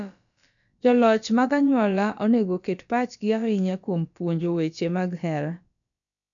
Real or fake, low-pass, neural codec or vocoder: fake; 7.2 kHz; codec, 16 kHz, about 1 kbps, DyCAST, with the encoder's durations